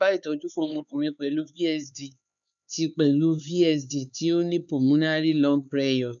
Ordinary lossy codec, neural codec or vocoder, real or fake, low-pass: none; codec, 16 kHz, 4 kbps, X-Codec, HuBERT features, trained on LibriSpeech; fake; 7.2 kHz